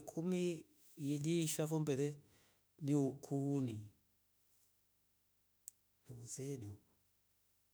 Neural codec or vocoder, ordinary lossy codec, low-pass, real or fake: autoencoder, 48 kHz, 32 numbers a frame, DAC-VAE, trained on Japanese speech; none; none; fake